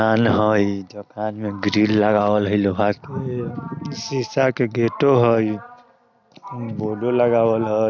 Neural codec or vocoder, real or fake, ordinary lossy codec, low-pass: none; real; none; 7.2 kHz